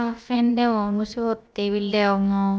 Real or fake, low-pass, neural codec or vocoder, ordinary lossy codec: fake; none; codec, 16 kHz, about 1 kbps, DyCAST, with the encoder's durations; none